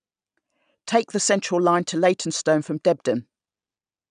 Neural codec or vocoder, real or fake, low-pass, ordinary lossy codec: none; real; 9.9 kHz; none